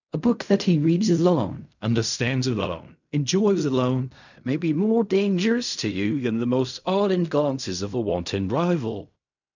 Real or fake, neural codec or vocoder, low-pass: fake; codec, 16 kHz in and 24 kHz out, 0.4 kbps, LongCat-Audio-Codec, fine tuned four codebook decoder; 7.2 kHz